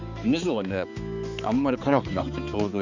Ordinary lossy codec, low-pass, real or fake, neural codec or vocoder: none; 7.2 kHz; fake; codec, 16 kHz, 4 kbps, X-Codec, HuBERT features, trained on balanced general audio